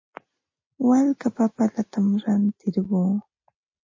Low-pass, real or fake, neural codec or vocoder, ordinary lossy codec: 7.2 kHz; real; none; MP3, 32 kbps